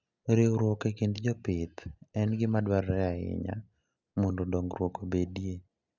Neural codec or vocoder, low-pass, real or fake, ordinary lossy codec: none; 7.2 kHz; real; none